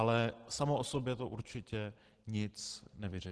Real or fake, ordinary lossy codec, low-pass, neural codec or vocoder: real; Opus, 16 kbps; 10.8 kHz; none